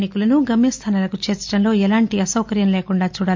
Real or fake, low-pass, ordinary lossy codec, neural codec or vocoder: real; 7.2 kHz; MP3, 64 kbps; none